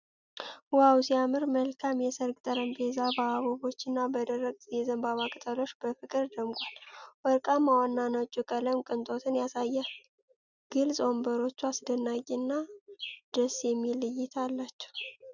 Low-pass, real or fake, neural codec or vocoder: 7.2 kHz; real; none